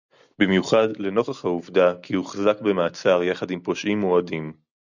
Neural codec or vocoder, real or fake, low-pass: none; real; 7.2 kHz